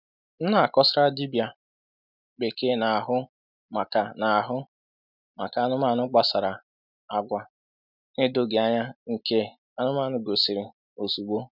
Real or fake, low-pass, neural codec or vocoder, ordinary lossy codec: real; 5.4 kHz; none; none